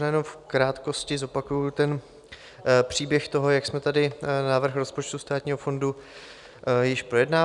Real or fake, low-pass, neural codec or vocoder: real; 10.8 kHz; none